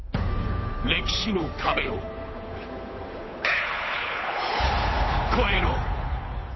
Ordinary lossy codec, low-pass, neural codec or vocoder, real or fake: MP3, 24 kbps; 7.2 kHz; codec, 16 kHz, 8 kbps, FunCodec, trained on Chinese and English, 25 frames a second; fake